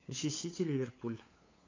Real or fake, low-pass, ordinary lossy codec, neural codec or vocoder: fake; 7.2 kHz; AAC, 32 kbps; codec, 16 kHz, 8 kbps, FunCodec, trained on LibriTTS, 25 frames a second